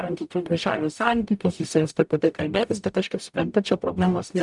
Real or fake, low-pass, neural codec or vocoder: fake; 10.8 kHz; codec, 44.1 kHz, 0.9 kbps, DAC